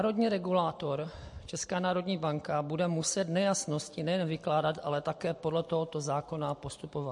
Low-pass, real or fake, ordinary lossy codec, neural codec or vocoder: 10.8 kHz; real; MP3, 48 kbps; none